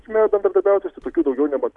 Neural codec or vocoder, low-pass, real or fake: none; 10.8 kHz; real